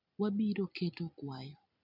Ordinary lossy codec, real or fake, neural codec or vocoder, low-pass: none; real; none; 5.4 kHz